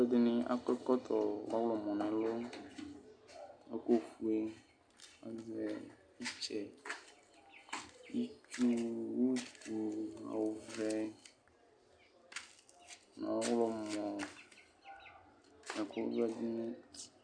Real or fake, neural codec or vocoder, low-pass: real; none; 9.9 kHz